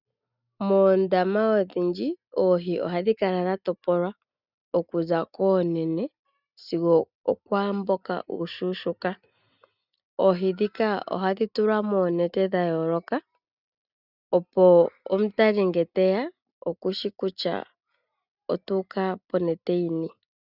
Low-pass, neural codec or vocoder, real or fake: 5.4 kHz; none; real